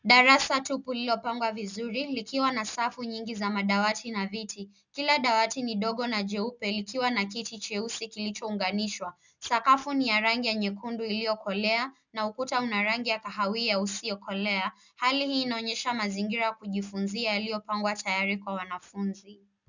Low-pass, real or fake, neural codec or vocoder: 7.2 kHz; real; none